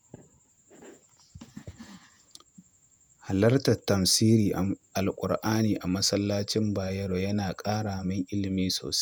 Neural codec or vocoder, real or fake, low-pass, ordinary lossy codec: vocoder, 48 kHz, 128 mel bands, Vocos; fake; none; none